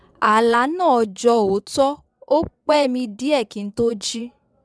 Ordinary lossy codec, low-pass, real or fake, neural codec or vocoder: none; none; fake; vocoder, 22.05 kHz, 80 mel bands, WaveNeXt